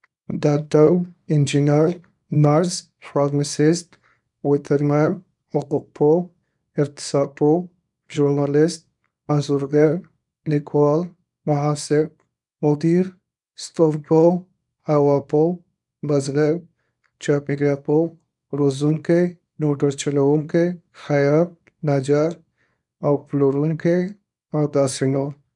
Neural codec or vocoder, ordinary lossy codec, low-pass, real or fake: codec, 24 kHz, 0.9 kbps, WavTokenizer, small release; none; 10.8 kHz; fake